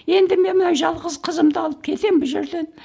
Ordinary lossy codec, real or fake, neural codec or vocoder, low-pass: none; real; none; none